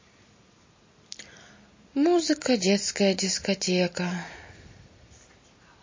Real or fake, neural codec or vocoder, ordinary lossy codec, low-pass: real; none; MP3, 32 kbps; 7.2 kHz